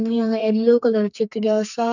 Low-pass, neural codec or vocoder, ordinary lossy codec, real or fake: 7.2 kHz; codec, 44.1 kHz, 2.6 kbps, SNAC; none; fake